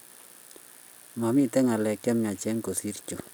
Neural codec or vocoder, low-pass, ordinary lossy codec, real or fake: none; none; none; real